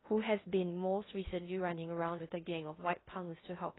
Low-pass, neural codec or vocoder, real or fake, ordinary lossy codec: 7.2 kHz; codec, 16 kHz in and 24 kHz out, 0.6 kbps, FocalCodec, streaming, 4096 codes; fake; AAC, 16 kbps